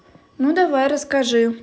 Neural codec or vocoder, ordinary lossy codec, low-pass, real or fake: none; none; none; real